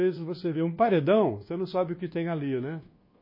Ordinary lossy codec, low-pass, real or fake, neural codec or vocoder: MP3, 24 kbps; 5.4 kHz; fake; codec, 24 kHz, 1.2 kbps, DualCodec